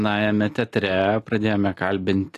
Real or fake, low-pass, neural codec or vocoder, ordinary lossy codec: real; 14.4 kHz; none; AAC, 48 kbps